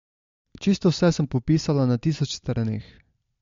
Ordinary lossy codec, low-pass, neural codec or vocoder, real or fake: MP3, 48 kbps; 7.2 kHz; none; real